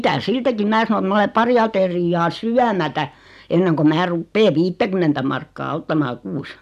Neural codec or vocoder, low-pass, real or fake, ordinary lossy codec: none; 14.4 kHz; real; none